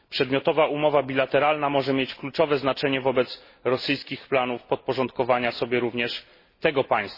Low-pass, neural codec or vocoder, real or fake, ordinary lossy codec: 5.4 kHz; none; real; MP3, 24 kbps